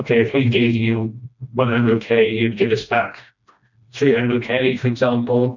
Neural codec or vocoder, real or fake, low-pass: codec, 16 kHz, 1 kbps, FreqCodec, smaller model; fake; 7.2 kHz